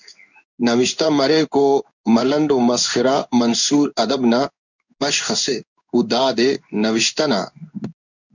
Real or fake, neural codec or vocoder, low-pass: fake; codec, 16 kHz in and 24 kHz out, 1 kbps, XY-Tokenizer; 7.2 kHz